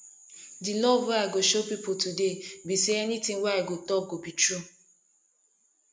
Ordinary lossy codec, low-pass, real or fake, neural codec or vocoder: none; none; real; none